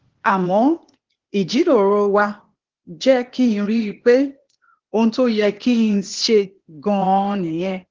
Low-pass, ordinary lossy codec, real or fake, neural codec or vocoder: 7.2 kHz; Opus, 16 kbps; fake; codec, 16 kHz, 0.8 kbps, ZipCodec